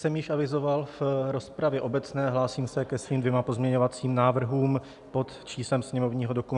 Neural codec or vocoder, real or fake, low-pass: none; real; 10.8 kHz